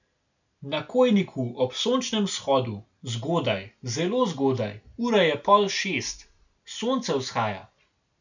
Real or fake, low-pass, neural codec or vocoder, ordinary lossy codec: real; 7.2 kHz; none; none